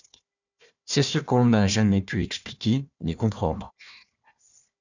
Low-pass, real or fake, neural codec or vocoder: 7.2 kHz; fake; codec, 16 kHz, 1 kbps, FunCodec, trained on Chinese and English, 50 frames a second